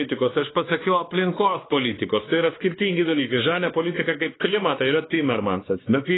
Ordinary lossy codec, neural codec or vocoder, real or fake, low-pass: AAC, 16 kbps; codec, 16 kHz, 2 kbps, X-Codec, WavLM features, trained on Multilingual LibriSpeech; fake; 7.2 kHz